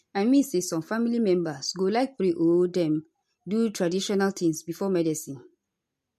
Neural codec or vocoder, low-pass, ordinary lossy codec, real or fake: none; 14.4 kHz; MP3, 64 kbps; real